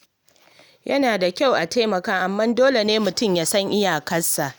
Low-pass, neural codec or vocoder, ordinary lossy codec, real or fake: none; none; none; real